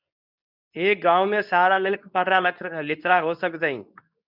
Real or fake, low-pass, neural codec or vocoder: fake; 5.4 kHz; codec, 24 kHz, 0.9 kbps, WavTokenizer, medium speech release version 2